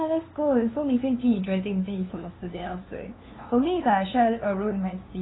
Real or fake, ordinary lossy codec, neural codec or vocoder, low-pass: fake; AAC, 16 kbps; codec, 16 kHz, 2 kbps, FunCodec, trained on LibriTTS, 25 frames a second; 7.2 kHz